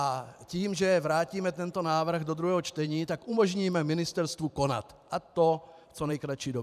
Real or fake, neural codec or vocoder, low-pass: fake; vocoder, 44.1 kHz, 128 mel bands every 512 samples, BigVGAN v2; 14.4 kHz